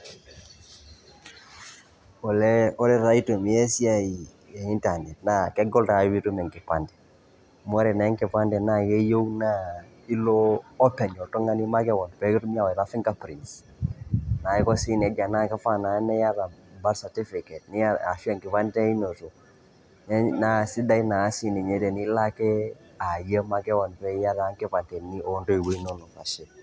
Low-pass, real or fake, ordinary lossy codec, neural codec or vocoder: none; real; none; none